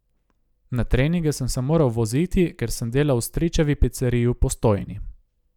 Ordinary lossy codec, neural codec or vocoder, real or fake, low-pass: none; none; real; 19.8 kHz